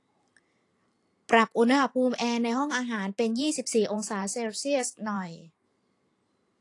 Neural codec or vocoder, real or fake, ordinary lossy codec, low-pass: vocoder, 44.1 kHz, 128 mel bands every 512 samples, BigVGAN v2; fake; AAC, 48 kbps; 10.8 kHz